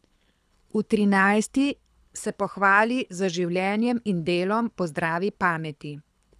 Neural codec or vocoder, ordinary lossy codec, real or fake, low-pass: codec, 24 kHz, 6 kbps, HILCodec; none; fake; none